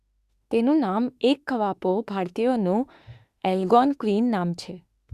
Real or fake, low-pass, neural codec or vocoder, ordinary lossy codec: fake; 14.4 kHz; autoencoder, 48 kHz, 32 numbers a frame, DAC-VAE, trained on Japanese speech; Opus, 64 kbps